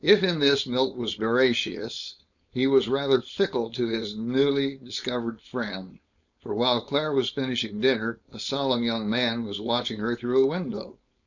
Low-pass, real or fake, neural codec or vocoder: 7.2 kHz; fake; codec, 16 kHz, 4.8 kbps, FACodec